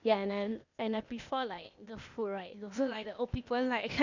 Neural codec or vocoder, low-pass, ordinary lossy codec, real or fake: codec, 16 kHz, 0.8 kbps, ZipCodec; 7.2 kHz; none; fake